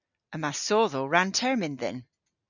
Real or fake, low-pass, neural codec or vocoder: real; 7.2 kHz; none